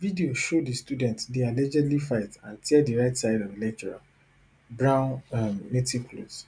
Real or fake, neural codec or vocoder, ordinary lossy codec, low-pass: real; none; none; 9.9 kHz